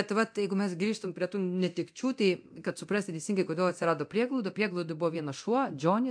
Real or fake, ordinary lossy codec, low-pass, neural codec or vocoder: fake; AAC, 64 kbps; 9.9 kHz; codec, 24 kHz, 0.9 kbps, DualCodec